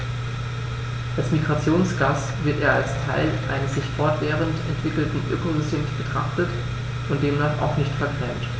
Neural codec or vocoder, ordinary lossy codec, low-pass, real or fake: none; none; none; real